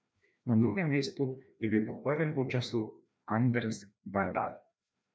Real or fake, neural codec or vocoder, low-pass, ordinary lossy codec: fake; codec, 16 kHz, 1 kbps, FreqCodec, larger model; none; none